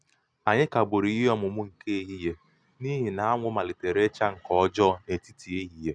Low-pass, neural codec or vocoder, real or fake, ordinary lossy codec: 9.9 kHz; none; real; none